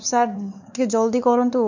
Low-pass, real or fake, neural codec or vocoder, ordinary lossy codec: 7.2 kHz; fake; codec, 16 kHz, 4 kbps, X-Codec, WavLM features, trained on Multilingual LibriSpeech; none